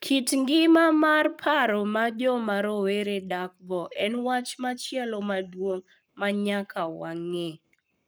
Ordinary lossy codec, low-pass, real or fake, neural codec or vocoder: none; none; fake; codec, 44.1 kHz, 7.8 kbps, Pupu-Codec